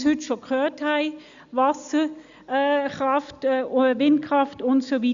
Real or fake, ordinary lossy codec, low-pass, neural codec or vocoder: real; Opus, 64 kbps; 7.2 kHz; none